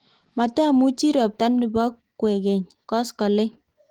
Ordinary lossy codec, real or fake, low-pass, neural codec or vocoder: Opus, 16 kbps; fake; 19.8 kHz; autoencoder, 48 kHz, 128 numbers a frame, DAC-VAE, trained on Japanese speech